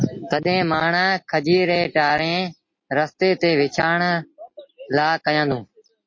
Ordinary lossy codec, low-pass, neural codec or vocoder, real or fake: MP3, 32 kbps; 7.2 kHz; none; real